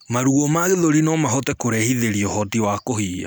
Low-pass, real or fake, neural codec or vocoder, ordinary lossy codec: none; real; none; none